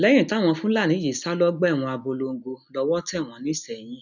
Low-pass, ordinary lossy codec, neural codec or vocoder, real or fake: 7.2 kHz; none; none; real